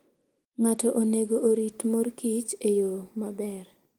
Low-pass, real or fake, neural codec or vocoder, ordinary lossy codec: 19.8 kHz; real; none; Opus, 24 kbps